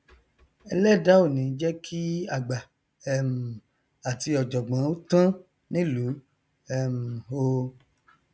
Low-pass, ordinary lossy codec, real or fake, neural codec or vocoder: none; none; real; none